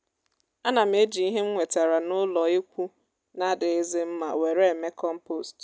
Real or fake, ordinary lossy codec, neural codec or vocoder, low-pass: real; none; none; none